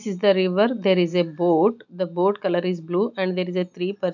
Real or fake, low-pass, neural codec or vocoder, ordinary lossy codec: real; 7.2 kHz; none; none